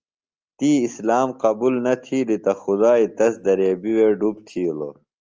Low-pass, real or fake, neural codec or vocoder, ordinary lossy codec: 7.2 kHz; real; none; Opus, 24 kbps